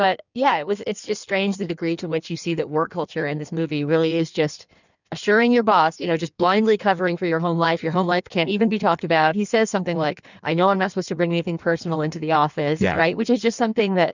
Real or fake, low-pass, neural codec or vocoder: fake; 7.2 kHz; codec, 16 kHz in and 24 kHz out, 1.1 kbps, FireRedTTS-2 codec